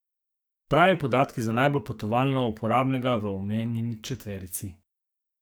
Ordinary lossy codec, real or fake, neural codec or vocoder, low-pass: none; fake; codec, 44.1 kHz, 2.6 kbps, SNAC; none